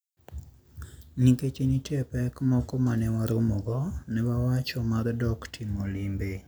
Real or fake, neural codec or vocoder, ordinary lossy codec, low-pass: real; none; none; none